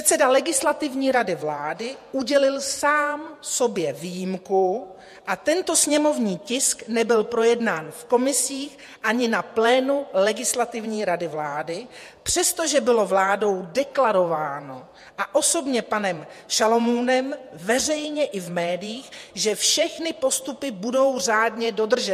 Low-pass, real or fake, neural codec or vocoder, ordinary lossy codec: 14.4 kHz; fake; vocoder, 48 kHz, 128 mel bands, Vocos; MP3, 64 kbps